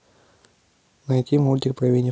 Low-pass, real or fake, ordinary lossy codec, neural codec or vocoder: none; real; none; none